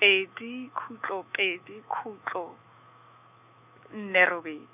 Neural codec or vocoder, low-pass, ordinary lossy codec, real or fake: autoencoder, 48 kHz, 128 numbers a frame, DAC-VAE, trained on Japanese speech; 3.6 kHz; none; fake